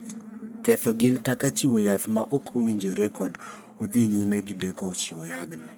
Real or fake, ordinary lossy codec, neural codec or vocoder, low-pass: fake; none; codec, 44.1 kHz, 1.7 kbps, Pupu-Codec; none